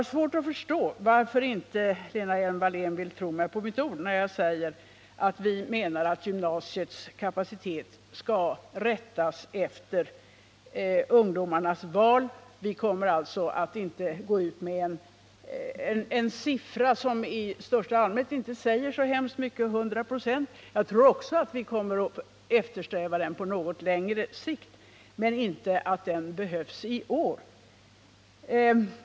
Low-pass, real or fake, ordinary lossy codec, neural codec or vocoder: none; real; none; none